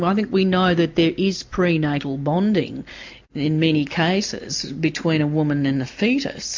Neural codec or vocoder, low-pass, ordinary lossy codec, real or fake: none; 7.2 kHz; MP3, 48 kbps; real